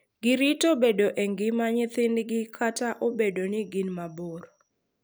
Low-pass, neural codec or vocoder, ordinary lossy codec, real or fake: none; none; none; real